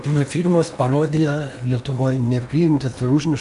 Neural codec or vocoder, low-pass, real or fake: codec, 16 kHz in and 24 kHz out, 0.8 kbps, FocalCodec, streaming, 65536 codes; 10.8 kHz; fake